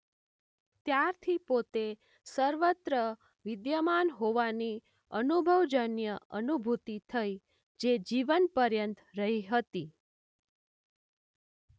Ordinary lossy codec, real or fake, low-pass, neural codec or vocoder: none; real; none; none